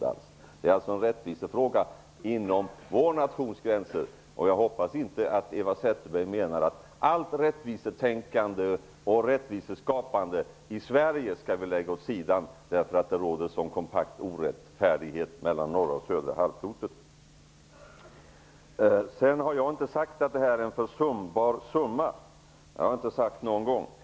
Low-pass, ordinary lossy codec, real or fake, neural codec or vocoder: none; none; real; none